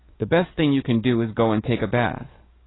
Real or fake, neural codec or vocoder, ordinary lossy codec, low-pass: fake; codec, 44.1 kHz, 7.8 kbps, DAC; AAC, 16 kbps; 7.2 kHz